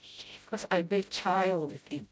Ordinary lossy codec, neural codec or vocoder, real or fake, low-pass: none; codec, 16 kHz, 0.5 kbps, FreqCodec, smaller model; fake; none